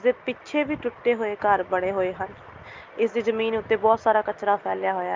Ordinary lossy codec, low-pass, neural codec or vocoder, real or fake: Opus, 24 kbps; 7.2 kHz; none; real